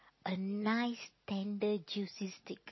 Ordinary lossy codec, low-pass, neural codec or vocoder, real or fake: MP3, 24 kbps; 7.2 kHz; none; real